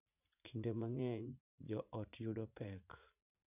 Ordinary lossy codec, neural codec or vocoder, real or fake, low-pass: none; vocoder, 44.1 kHz, 80 mel bands, Vocos; fake; 3.6 kHz